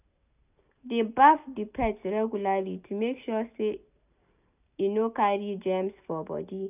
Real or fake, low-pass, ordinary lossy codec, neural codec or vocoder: real; 3.6 kHz; none; none